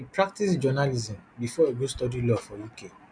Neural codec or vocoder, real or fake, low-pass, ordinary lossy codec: none; real; 9.9 kHz; none